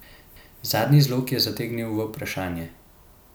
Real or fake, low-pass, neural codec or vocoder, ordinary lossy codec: real; none; none; none